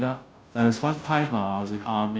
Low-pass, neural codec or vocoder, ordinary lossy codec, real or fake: none; codec, 16 kHz, 0.5 kbps, FunCodec, trained on Chinese and English, 25 frames a second; none; fake